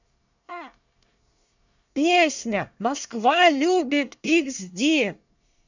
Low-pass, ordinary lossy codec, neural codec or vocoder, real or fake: 7.2 kHz; none; codec, 24 kHz, 1 kbps, SNAC; fake